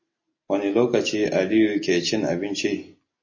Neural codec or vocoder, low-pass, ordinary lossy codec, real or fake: none; 7.2 kHz; MP3, 32 kbps; real